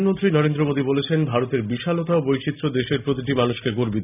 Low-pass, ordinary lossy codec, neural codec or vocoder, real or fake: 3.6 kHz; none; none; real